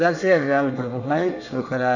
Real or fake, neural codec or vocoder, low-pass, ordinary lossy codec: fake; codec, 24 kHz, 1 kbps, SNAC; 7.2 kHz; none